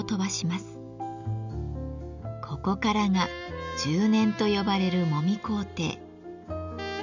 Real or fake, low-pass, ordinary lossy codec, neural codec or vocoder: real; 7.2 kHz; none; none